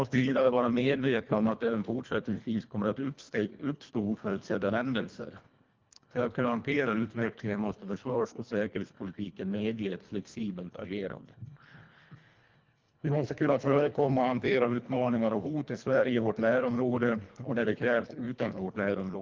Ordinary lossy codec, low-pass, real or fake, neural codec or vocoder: Opus, 24 kbps; 7.2 kHz; fake; codec, 24 kHz, 1.5 kbps, HILCodec